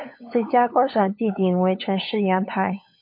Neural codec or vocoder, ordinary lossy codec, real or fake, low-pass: codec, 16 kHz, 8 kbps, FunCodec, trained on LibriTTS, 25 frames a second; MP3, 32 kbps; fake; 5.4 kHz